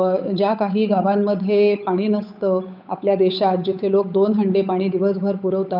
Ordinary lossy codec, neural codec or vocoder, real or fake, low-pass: none; codec, 16 kHz, 8 kbps, FunCodec, trained on Chinese and English, 25 frames a second; fake; 5.4 kHz